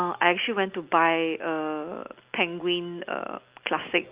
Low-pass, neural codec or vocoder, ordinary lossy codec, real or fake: 3.6 kHz; none; Opus, 32 kbps; real